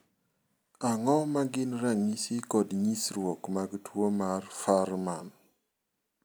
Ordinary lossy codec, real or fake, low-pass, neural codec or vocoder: none; real; none; none